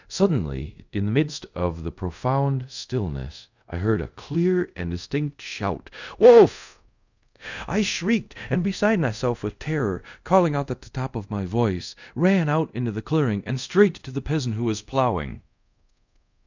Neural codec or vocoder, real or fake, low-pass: codec, 24 kHz, 0.5 kbps, DualCodec; fake; 7.2 kHz